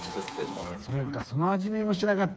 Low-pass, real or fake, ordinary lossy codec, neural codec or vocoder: none; fake; none; codec, 16 kHz, 4 kbps, FreqCodec, smaller model